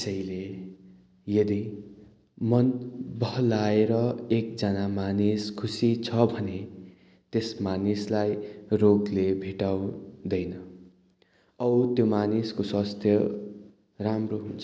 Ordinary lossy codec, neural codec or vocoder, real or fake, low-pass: none; none; real; none